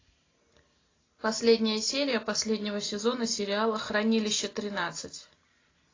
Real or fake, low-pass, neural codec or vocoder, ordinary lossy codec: real; 7.2 kHz; none; AAC, 32 kbps